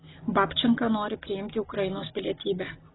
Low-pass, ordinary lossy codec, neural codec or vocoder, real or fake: 7.2 kHz; AAC, 16 kbps; none; real